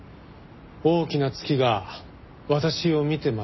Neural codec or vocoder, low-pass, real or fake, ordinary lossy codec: none; 7.2 kHz; real; MP3, 24 kbps